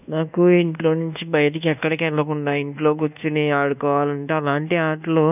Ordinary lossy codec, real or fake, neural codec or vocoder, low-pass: none; fake; codec, 24 kHz, 1.2 kbps, DualCodec; 3.6 kHz